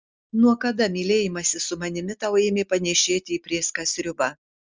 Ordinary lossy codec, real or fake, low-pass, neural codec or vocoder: Opus, 32 kbps; real; 7.2 kHz; none